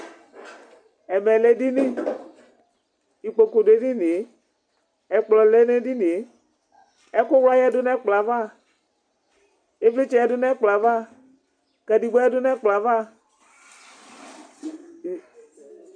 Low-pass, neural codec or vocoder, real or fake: 9.9 kHz; none; real